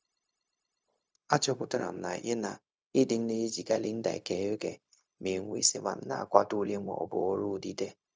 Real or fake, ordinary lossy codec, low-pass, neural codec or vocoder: fake; none; none; codec, 16 kHz, 0.4 kbps, LongCat-Audio-Codec